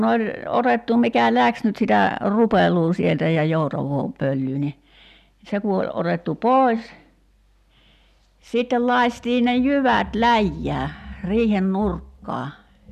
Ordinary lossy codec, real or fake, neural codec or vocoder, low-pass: none; real; none; 14.4 kHz